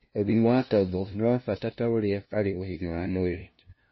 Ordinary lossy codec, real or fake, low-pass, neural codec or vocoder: MP3, 24 kbps; fake; 7.2 kHz; codec, 16 kHz, 0.5 kbps, FunCodec, trained on LibriTTS, 25 frames a second